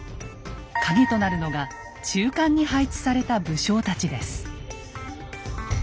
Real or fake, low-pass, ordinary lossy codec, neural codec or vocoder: real; none; none; none